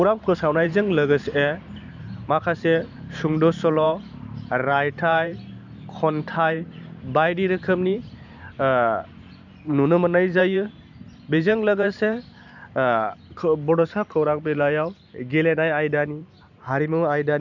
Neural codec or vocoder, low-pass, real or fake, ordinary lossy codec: vocoder, 44.1 kHz, 128 mel bands every 512 samples, BigVGAN v2; 7.2 kHz; fake; none